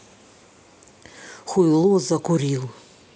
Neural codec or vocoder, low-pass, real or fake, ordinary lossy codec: none; none; real; none